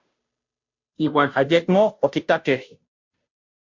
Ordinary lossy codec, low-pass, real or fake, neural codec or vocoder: MP3, 48 kbps; 7.2 kHz; fake; codec, 16 kHz, 0.5 kbps, FunCodec, trained on Chinese and English, 25 frames a second